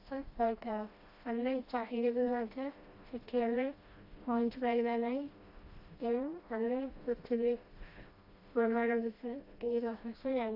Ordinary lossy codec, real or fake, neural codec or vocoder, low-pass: AAC, 32 kbps; fake; codec, 16 kHz, 1 kbps, FreqCodec, smaller model; 5.4 kHz